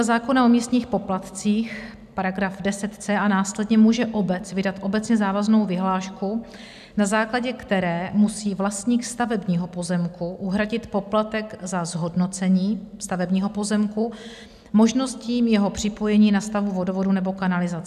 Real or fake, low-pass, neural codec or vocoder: real; 14.4 kHz; none